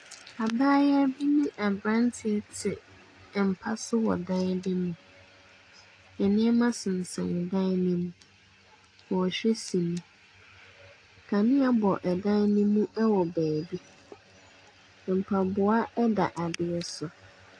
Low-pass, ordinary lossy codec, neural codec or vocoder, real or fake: 9.9 kHz; AAC, 48 kbps; none; real